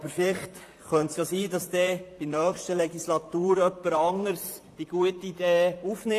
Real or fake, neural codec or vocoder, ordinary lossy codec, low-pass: fake; vocoder, 44.1 kHz, 128 mel bands, Pupu-Vocoder; AAC, 64 kbps; 14.4 kHz